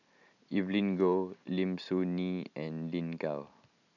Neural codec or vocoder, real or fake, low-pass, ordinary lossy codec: none; real; 7.2 kHz; none